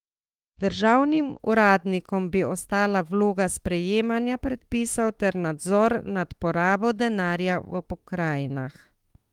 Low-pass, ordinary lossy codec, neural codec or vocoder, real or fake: 19.8 kHz; Opus, 24 kbps; autoencoder, 48 kHz, 32 numbers a frame, DAC-VAE, trained on Japanese speech; fake